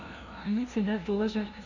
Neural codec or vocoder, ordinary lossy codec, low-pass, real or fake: codec, 16 kHz, 0.5 kbps, FunCodec, trained on LibriTTS, 25 frames a second; none; 7.2 kHz; fake